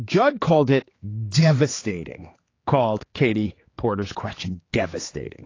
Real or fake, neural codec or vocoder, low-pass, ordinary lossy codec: fake; codec, 16 kHz, 4 kbps, X-Codec, HuBERT features, trained on general audio; 7.2 kHz; AAC, 32 kbps